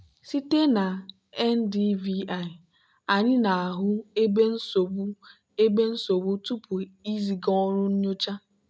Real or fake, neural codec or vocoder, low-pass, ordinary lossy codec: real; none; none; none